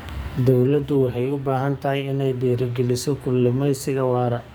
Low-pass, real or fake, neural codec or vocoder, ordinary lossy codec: none; fake; codec, 44.1 kHz, 2.6 kbps, SNAC; none